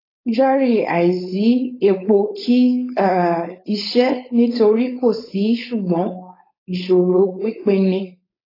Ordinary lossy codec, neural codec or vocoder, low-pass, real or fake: AAC, 24 kbps; codec, 16 kHz, 4.8 kbps, FACodec; 5.4 kHz; fake